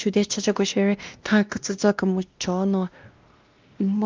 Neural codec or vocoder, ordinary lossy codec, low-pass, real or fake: codec, 16 kHz, 1 kbps, X-Codec, WavLM features, trained on Multilingual LibriSpeech; Opus, 32 kbps; 7.2 kHz; fake